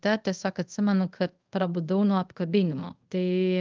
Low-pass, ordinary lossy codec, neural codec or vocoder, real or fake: 7.2 kHz; Opus, 24 kbps; codec, 24 kHz, 0.5 kbps, DualCodec; fake